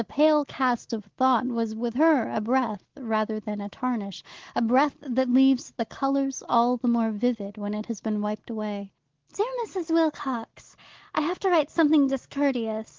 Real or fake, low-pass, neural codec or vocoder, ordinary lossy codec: real; 7.2 kHz; none; Opus, 16 kbps